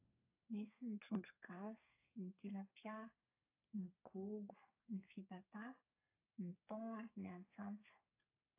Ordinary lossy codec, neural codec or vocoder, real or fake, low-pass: AAC, 24 kbps; codec, 32 kHz, 1.9 kbps, SNAC; fake; 3.6 kHz